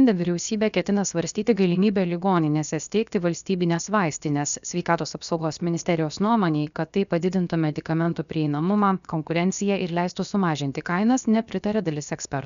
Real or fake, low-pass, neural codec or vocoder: fake; 7.2 kHz; codec, 16 kHz, 0.7 kbps, FocalCodec